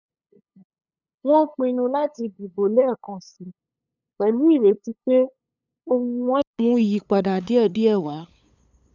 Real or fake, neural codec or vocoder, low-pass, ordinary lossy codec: fake; codec, 16 kHz, 8 kbps, FunCodec, trained on LibriTTS, 25 frames a second; 7.2 kHz; none